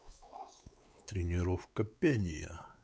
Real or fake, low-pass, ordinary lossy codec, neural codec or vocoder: fake; none; none; codec, 16 kHz, 4 kbps, X-Codec, WavLM features, trained on Multilingual LibriSpeech